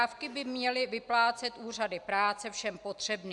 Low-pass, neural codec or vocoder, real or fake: 10.8 kHz; none; real